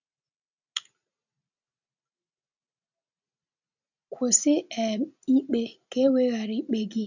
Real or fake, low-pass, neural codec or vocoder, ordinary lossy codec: real; 7.2 kHz; none; none